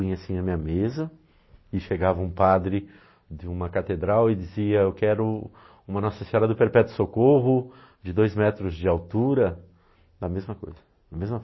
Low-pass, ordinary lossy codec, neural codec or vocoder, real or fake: 7.2 kHz; MP3, 24 kbps; none; real